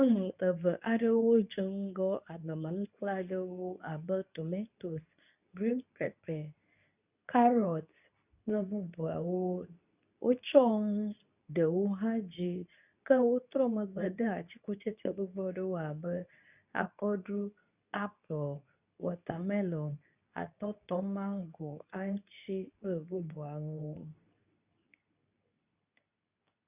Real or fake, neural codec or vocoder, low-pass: fake; codec, 24 kHz, 0.9 kbps, WavTokenizer, medium speech release version 2; 3.6 kHz